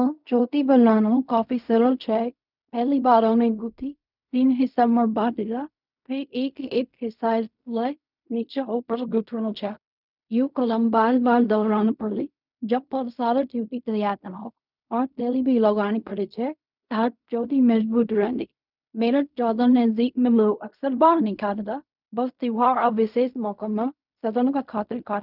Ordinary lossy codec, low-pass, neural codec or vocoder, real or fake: none; 5.4 kHz; codec, 16 kHz in and 24 kHz out, 0.4 kbps, LongCat-Audio-Codec, fine tuned four codebook decoder; fake